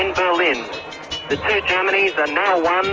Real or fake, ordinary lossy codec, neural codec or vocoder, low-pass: real; Opus, 24 kbps; none; 7.2 kHz